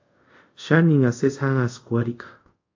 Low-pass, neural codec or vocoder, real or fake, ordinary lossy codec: 7.2 kHz; codec, 24 kHz, 0.5 kbps, DualCodec; fake; AAC, 48 kbps